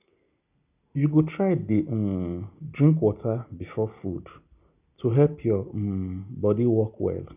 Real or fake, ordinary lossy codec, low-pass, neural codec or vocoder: real; none; 3.6 kHz; none